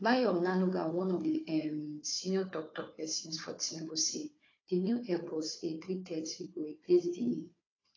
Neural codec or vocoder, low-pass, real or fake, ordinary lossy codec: codec, 16 kHz, 4 kbps, FunCodec, trained on Chinese and English, 50 frames a second; 7.2 kHz; fake; AAC, 32 kbps